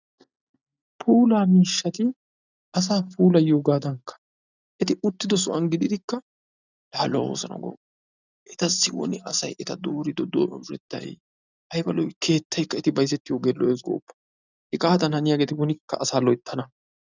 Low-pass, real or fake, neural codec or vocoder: 7.2 kHz; real; none